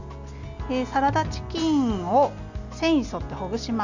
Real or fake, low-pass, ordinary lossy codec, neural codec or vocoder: real; 7.2 kHz; none; none